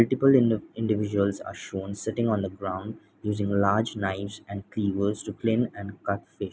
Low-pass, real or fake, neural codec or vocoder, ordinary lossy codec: none; real; none; none